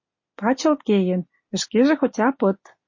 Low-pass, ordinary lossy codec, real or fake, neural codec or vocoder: 7.2 kHz; MP3, 32 kbps; real; none